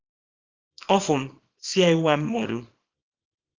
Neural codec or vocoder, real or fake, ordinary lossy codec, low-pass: codec, 24 kHz, 0.9 kbps, WavTokenizer, small release; fake; Opus, 32 kbps; 7.2 kHz